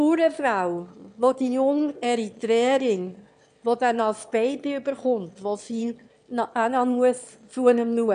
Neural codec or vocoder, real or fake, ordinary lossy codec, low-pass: autoencoder, 22.05 kHz, a latent of 192 numbers a frame, VITS, trained on one speaker; fake; AAC, 96 kbps; 9.9 kHz